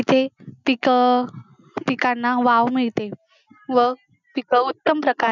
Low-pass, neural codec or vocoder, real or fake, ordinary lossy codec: 7.2 kHz; none; real; none